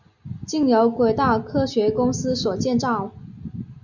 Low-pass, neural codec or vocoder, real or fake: 7.2 kHz; none; real